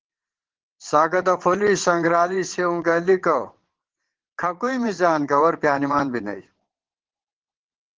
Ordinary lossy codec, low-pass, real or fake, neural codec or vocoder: Opus, 16 kbps; 7.2 kHz; fake; vocoder, 22.05 kHz, 80 mel bands, WaveNeXt